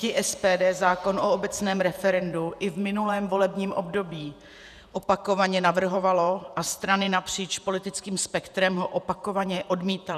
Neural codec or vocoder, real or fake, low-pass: vocoder, 48 kHz, 128 mel bands, Vocos; fake; 14.4 kHz